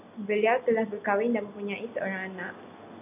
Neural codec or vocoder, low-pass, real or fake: none; 3.6 kHz; real